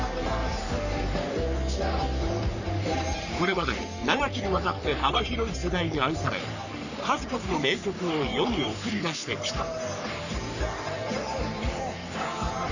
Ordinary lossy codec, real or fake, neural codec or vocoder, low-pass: none; fake; codec, 44.1 kHz, 3.4 kbps, Pupu-Codec; 7.2 kHz